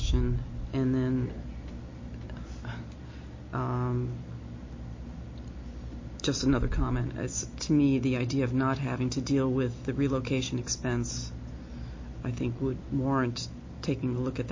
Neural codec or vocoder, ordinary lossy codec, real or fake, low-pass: none; MP3, 32 kbps; real; 7.2 kHz